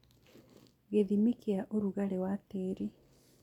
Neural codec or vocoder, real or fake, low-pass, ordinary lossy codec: none; real; 19.8 kHz; none